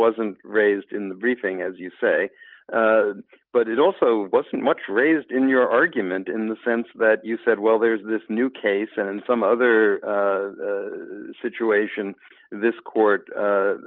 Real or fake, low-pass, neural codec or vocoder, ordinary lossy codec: real; 5.4 kHz; none; Opus, 24 kbps